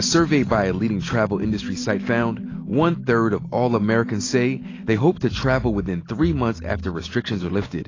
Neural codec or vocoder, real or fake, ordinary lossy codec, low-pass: none; real; AAC, 32 kbps; 7.2 kHz